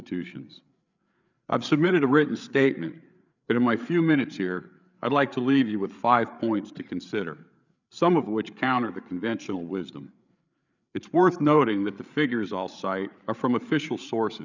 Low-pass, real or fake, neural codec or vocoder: 7.2 kHz; fake; codec, 16 kHz, 8 kbps, FreqCodec, larger model